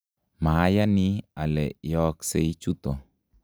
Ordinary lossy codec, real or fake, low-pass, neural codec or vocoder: none; real; none; none